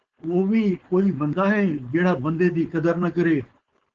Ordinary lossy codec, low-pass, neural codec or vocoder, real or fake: Opus, 16 kbps; 7.2 kHz; codec, 16 kHz, 4.8 kbps, FACodec; fake